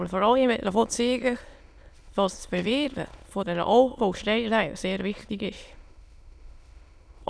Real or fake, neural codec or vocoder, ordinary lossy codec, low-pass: fake; autoencoder, 22.05 kHz, a latent of 192 numbers a frame, VITS, trained on many speakers; none; none